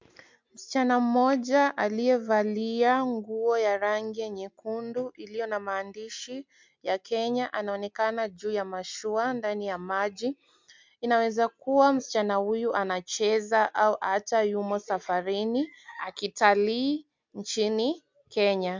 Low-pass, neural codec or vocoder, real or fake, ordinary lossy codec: 7.2 kHz; none; real; MP3, 64 kbps